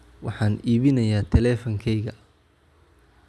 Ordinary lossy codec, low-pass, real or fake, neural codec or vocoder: none; none; real; none